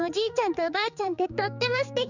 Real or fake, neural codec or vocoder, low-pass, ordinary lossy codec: fake; codec, 16 kHz, 4 kbps, X-Codec, HuBERT features, trained on general audio; 7.2 kHz; none